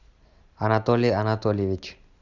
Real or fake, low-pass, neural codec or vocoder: real; 7.2 kHz; none